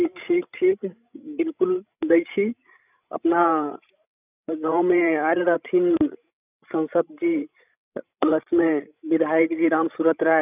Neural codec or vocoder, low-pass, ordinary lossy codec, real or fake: codec, 16 kHz, 16 kbps, FreqCodec, larger model; 3.6 kHz; none; fake